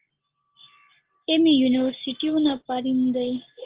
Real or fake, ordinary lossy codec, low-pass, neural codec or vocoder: real; Opus, 16 kbps; 3.6 kHz; none